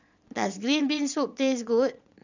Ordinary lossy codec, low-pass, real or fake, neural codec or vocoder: none; 7.2 kHz; fake; vocoder, 22.05 kHz, 80 mel bands, Vocos